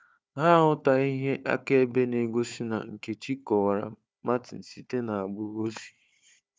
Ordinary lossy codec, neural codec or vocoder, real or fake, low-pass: none; codec, 16 kHz, 4 kbps, FunCodec, trained on Chinese and English, 50 frames a second; fake; none